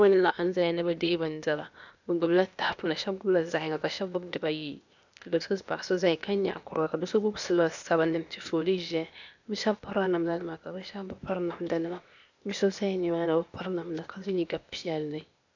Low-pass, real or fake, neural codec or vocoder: 7.2 kHz; fake; codec, 16 kHz, 0.8 kbps, ZipCodec